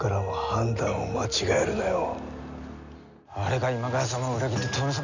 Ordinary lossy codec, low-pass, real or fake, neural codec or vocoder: none; 7.2 kHz; real; none